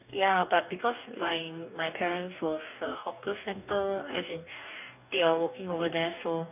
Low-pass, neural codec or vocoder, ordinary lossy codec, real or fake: 3.6 kHz; codec, 44.1 kHz, 2.6 kbps, DAC; none; fake